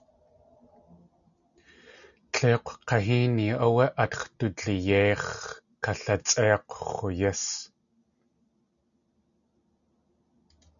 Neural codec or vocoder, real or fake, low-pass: none; real; 7.2 kHz